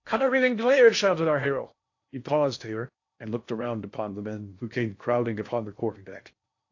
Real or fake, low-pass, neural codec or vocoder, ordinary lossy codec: fake; 7.2 kHz; codec, 16 kHz in and 24 kHz out, 0.6 kbps, FocalCodec, streaming, 4096 codes; AAC, 48 kbps